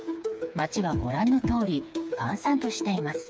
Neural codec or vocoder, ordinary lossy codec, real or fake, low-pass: codec, 16 kHz, 4 kbps, FreqCodec, smaller model; none; fake; none